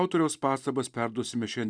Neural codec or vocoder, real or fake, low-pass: none; real; 9.9 kHz